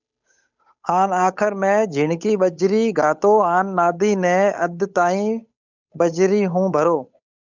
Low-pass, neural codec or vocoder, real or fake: 7.2 kHz; codec, 16 kHz, 8 kbps, FunCodec, trained on Chinese and English, 25 frames a second; fake